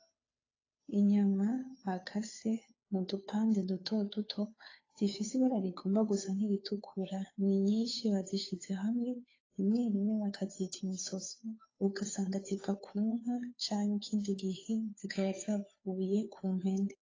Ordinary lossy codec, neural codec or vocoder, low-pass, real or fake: AAC, 32 kbps; codec, 16 kHz, 2 kbps, FunCodec, trained on Chinese and English, 25 frames a second; 7.2 kHz; fake